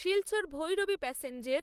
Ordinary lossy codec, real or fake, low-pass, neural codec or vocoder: Opus, 24 kbps; fake; 14.4 kHz; vocoder, 44.1 kHz, 128 mel bands, Pupu-Vocoder